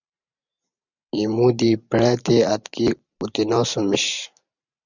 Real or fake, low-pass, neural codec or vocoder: fake; 7.2 kHz; vocoder, 44.1 kHz, 128 mel bands every 512 samples, BigVGAN v2